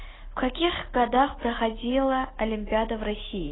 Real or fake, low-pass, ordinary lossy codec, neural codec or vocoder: real; 7.2 kHz; AAC, 16 kbps; none